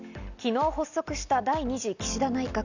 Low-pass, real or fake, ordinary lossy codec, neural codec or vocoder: 7.2 kHz; real; none; none